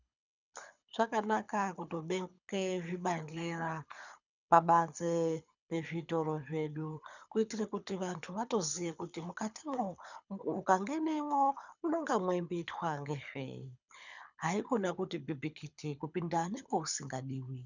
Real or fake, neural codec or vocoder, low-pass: fake; codec, 24 kHz, 6 kbps, HILCodec; 7.2 kHz